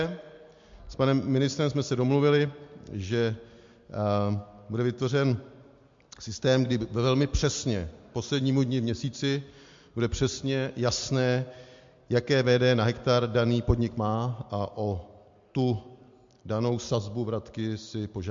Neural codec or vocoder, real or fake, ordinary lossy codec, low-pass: none; real; MP3, 48 kbps; 7.2 kHz